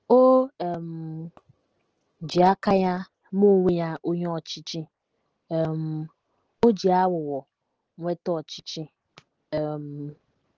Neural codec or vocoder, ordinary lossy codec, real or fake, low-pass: none; Opus, 16 kbps; real; 7.2 kHz